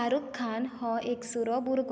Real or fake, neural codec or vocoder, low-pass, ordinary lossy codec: real; none; none; none